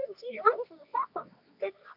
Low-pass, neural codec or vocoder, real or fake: 5.4 kHz; codec, 24 kHz, 0.9 kbps, WavTokenizer, medium music audio release; fake